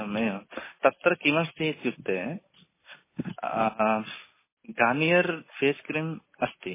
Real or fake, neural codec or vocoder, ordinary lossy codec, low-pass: real; none; MP3, 16 kbps; 3.6 kHz